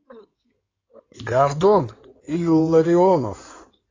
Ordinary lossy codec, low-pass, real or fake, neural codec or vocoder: AAC, 32 kbps; 7.2 kHz; fake; codec, 16 kHz in and 24 kHz out, 2.2 kbps, FireRedTTS-2 codec